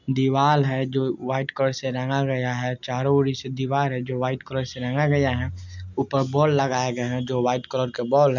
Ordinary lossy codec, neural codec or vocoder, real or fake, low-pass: none; none; real; 7.2 kHz